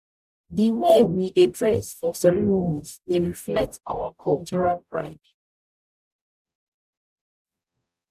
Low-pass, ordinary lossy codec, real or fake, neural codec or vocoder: 14.4 kHz; none; fake; codec, 44.1 kHz, 0.9 kbps, DAC